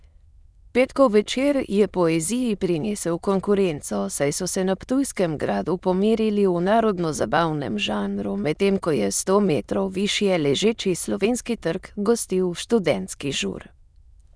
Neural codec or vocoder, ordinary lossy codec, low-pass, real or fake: autoencoder, 22.05 kHz, a latent of 192 numbers a frame, VITS, trained on many speakers; none; none; fake